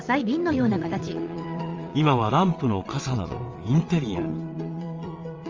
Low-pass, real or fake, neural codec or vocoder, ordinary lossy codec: 7.2 kHz; fake; vocoder, 22.05 kHz, 80 mel bands, WaveNeXt; Opus, 32 kbps